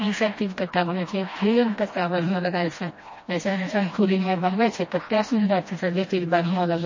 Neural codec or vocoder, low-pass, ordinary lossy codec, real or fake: codec, 16 kHz, 1 kbps, FreqCodec, smaller model; 7.2 kHz; MP3, 32 kbps; fake